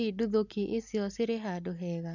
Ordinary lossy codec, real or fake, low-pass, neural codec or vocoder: none; real; 7.2 kHz; none